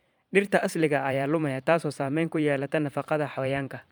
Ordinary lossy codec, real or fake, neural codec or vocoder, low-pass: none; fake; vocoder, 44.1 kHz, 128 mel bands every 512 samples, BigVGAN v2; none